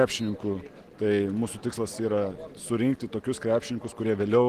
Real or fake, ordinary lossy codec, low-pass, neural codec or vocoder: real; Opus, 16 kbps; 14.4 kHz; none